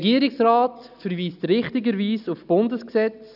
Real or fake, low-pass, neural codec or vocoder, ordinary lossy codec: real; 5.4 kHz; none; none